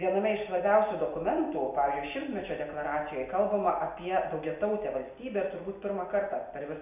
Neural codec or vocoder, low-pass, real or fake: none; 3.6 kHz; real